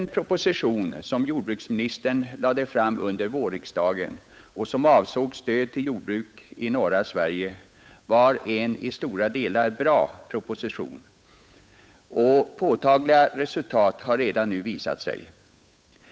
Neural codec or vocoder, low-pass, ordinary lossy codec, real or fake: codec, 16 kHz, 8 kbps, FunCodec, trained on Chinese and English, 25 frames a second; none; none; fake